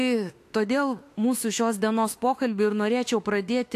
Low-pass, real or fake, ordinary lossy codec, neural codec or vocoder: 14.4 kHz; fake; AAC, 64 kbps; autoencoder, 48 kHz, 32 numbers a frame, DAC-VAE, trained on Japanese speech